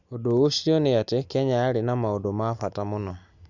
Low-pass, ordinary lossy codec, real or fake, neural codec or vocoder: 7.2 kHz; none; real; none